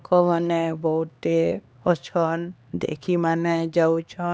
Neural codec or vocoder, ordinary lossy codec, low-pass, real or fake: codec, 16 kHz, 2 kbps, X-Codec, HuBERT features, trained on LibriSpeech; none; none; fake